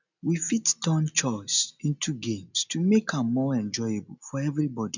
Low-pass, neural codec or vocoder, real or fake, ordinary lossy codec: 7.2 kHz; none; real; none